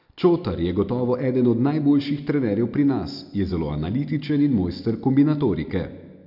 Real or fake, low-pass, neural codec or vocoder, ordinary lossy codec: real; 5.4 kHz; none; none